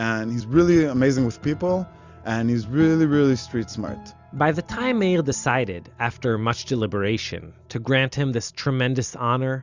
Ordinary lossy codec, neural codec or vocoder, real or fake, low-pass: Opus, 64 kbps; none; real; 7.2 kHz